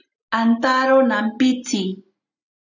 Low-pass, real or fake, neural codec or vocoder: 7.2 kHz; real; none